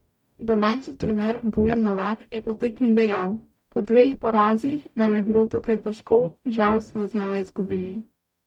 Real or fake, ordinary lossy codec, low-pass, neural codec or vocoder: fake; MP3, 96 kbps; 19.8 kHz; codec, 44.1 kHz, 0.9 kbps, DAC